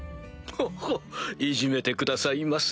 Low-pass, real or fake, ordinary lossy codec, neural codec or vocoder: none; real; none; none